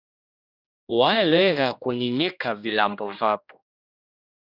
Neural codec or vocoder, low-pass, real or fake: codec, 16 kHz, 1 kbps, X-Codec, HuBERT features, trained on general audio; 5.4 kHz; fake